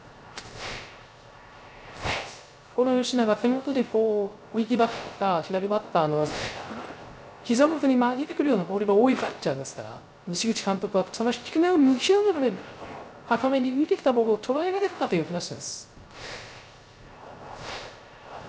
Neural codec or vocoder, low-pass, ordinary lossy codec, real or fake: codec, 16 kHz, 0.3 kbps, FocalCodec; none; none; fake